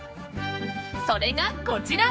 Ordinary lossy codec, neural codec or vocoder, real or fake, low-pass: none; codec, 16 kHz, 4 kbps, X-Codec, HuBERT features, trained on general audio; fake; none